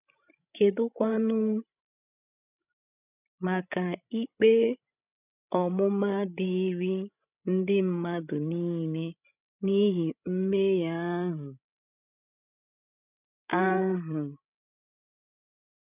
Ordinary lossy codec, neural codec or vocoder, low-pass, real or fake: none; codec, 16 kHz, 16 kbps, FreqCodec, larger model; 3.6 kHz; fake